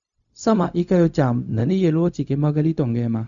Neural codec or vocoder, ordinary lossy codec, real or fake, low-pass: codec, 16 kHz, 0.4 kbps, LongCat-Audio-Codec; none; fake; 7.2 kHz